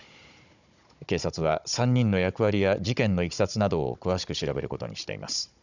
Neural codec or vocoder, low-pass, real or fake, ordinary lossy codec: codec, 16 kHz, 16 kbps, FunCodec, trained on Chinese and English, 50 frames a second; 7.2 kHz; fake; none